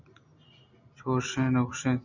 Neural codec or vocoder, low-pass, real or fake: none; 7.2 kHz; real